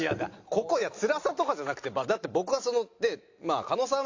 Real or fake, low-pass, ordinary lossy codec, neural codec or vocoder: real; 7.2 kHz; AAC, 48 kbps; none